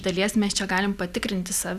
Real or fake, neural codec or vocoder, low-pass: real; none; 14.4 kHz